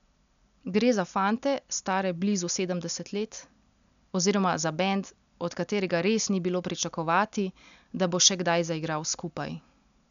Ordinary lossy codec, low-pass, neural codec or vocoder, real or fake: none; 7.2 kHz; none; real